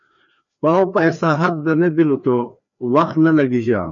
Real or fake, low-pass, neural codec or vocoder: fake; 7.2 kHz; codec, 16 kHz, 2 kbps, FreqCodec, larger model